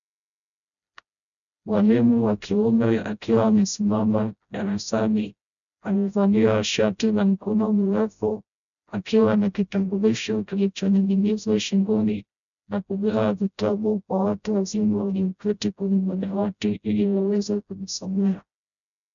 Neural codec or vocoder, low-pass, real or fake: codec, 16 kHz, 0.5 kbps, FreqCodec, smaller model; 7.2 kHz; fake